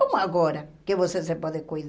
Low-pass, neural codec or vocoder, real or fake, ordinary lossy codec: none; none; real; none